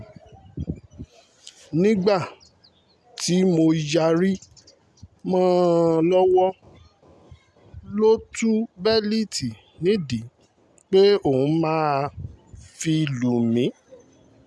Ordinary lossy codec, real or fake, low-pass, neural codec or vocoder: none; real; none; none